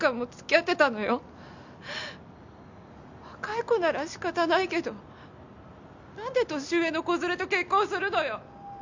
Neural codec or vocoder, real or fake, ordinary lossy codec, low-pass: none; real; MP3, 64 kbps; 7.2 kHz